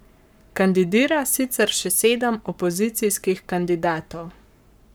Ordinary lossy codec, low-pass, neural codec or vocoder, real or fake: none; none; codec, 44.1 kHz, 7.8 kbps, Pupu-Codec; fake